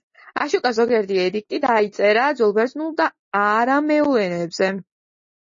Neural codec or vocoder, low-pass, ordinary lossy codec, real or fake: none; 7.2 kHz; MP3, 32 kbps; real